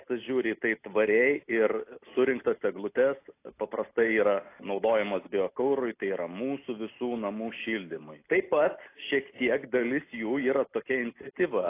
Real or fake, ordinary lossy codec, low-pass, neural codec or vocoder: real; AAC, 24 kbps; 3.6 kHz; none